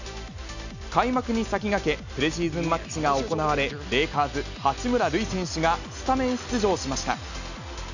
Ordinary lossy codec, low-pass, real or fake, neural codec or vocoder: none; 7.2 kHz; real; none